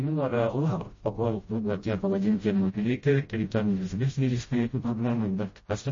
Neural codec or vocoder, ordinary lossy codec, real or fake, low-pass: codec, 16 kHz, 0.5 kbps, FreqCodec, smaller model; MP3, 32 kbps; fake; 7.2 kHz